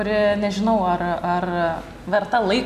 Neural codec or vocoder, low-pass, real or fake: none; 14.4 kHz; real